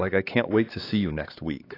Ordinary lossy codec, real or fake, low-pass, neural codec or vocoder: AAC, 24 kbps; fake; 5.4 kHz; codec, 16 kHz, 4 kbps, X-Codec, HuBERT features, trained on LibriSpeech